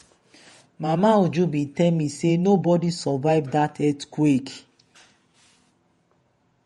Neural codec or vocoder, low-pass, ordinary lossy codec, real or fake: vocoder, 48 kHz, 128 mel bands, Vocos; 19.8 kHz; MP3, 48 kbps; fake